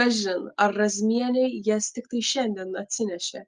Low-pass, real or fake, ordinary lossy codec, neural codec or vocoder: 10.8 kHz; real; Opus, 32 kbps; none